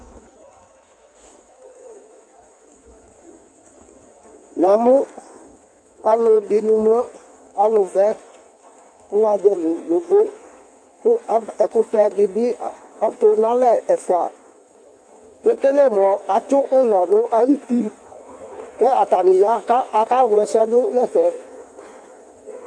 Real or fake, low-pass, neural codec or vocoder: fake; 9.9 kHz; codec, 16 kHz in and 24 kHz out, 1.1 kbps, FireRedTTS-2 codec